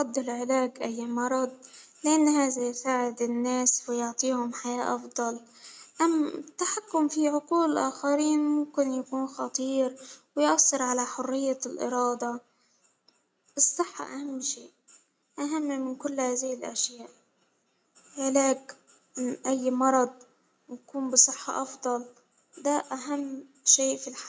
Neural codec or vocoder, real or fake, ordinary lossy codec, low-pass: none; real; none; none